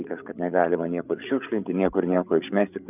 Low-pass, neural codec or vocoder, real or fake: 3.6 kHz; codec, 16 kHz, 8 kbps, FreqCodec, larger model; fake